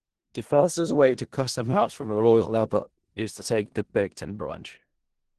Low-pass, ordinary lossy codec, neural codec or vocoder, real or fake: 10.8 kHz; Opus, 16 kbps; codec, 16 kHz in and 24 kHz out, 0.4 kbps, LongCat-Audio-Codec, four codebook decoder; fake